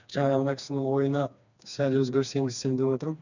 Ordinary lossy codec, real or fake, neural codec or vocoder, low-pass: none; fake; codec, 16 kHz, 2 kbps, FreqCodec, smaller model; 7.2 kHz